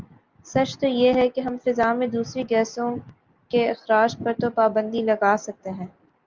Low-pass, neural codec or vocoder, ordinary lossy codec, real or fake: 7.2 kHz; none; Opus, 24 kbps; real